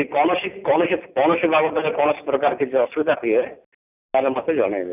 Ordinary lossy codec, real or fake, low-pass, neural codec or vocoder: none; real; 3.6 kHz; none